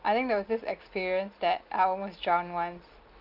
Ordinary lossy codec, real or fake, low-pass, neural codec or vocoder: Opus, 32 kbps; real; 5.4 kHz; none